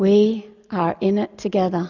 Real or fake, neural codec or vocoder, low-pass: real; none; 7.2 kHz